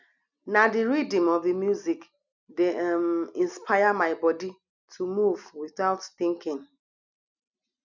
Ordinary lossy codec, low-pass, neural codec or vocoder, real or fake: none; 7.2 kHz; none; real